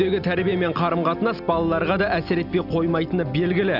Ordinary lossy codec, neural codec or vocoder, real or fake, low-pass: none; none; real; 5.4 kHz